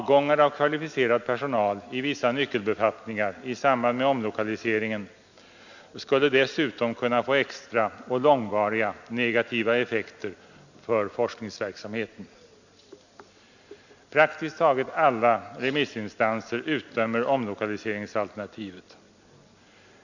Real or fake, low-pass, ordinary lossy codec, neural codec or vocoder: real; 7.2 kHz; none; none